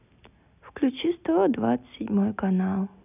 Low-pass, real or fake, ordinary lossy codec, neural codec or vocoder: 3.6 kHz; real; none; none